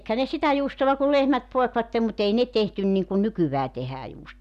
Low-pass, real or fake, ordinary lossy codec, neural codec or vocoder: 14.4 kHz; real; none; none